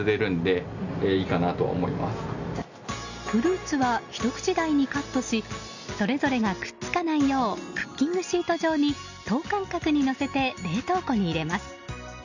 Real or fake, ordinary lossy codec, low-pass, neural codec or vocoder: real; none; 7.2 kHz; none